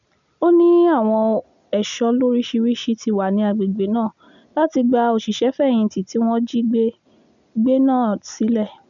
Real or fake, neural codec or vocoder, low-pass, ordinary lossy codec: real; none; 7.2 kHz; none